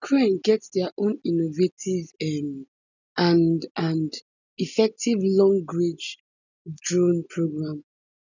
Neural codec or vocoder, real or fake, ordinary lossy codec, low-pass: none; real; none; 7.2 kHz